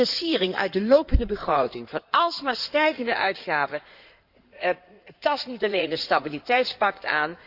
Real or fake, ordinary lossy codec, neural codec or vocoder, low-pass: fake; Opus, 64 kbps; codec, 16 kHz in and 24 kHz out, 2.2 kbps, FireRedTTS-2 codec; 5.4 kHz